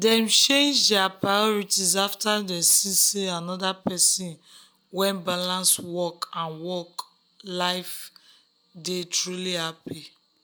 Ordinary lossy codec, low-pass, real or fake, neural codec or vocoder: none; none; real; none